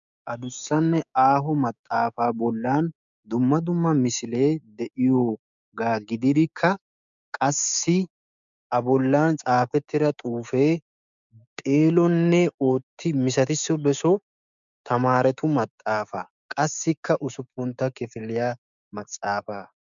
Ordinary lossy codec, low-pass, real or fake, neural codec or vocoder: MP3, 96 kbps; 7.2 kHz; fake; codec, 16 kHz, 6 kbps, DAC